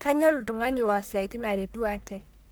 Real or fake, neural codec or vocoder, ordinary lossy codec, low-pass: fake; codec, 44.1 kHz, 1.7 kbps, Pupu-Codec; none; none